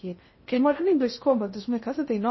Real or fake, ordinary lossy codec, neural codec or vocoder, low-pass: fake; MP3, 24 kbps; codec, 16 kHz in and 24 kHz out, 0.6 kbps, FocalCodec, streaming, 2048 codes; 7.2 kHz